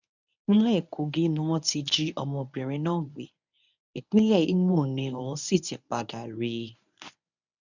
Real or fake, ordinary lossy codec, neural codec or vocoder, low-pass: fake; none; codec, 24 kHz, 0.9 kbps, WavTokenizer, medium speech release version 1; 7.2 kHz